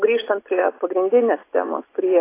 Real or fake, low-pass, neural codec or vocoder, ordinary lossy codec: real; 3.6 kHz; none; AAC, 24 kbps